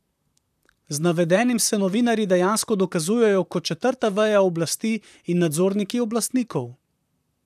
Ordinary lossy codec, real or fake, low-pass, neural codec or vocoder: none; fake; 14.4 kHz; vocoder, 44.1 kHz, 128 mel bands, Pupu-Vocoder